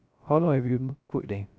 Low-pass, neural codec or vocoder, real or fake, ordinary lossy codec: none; codec, 16 kHz, 0.3 kbps, FocalCodec; fake; none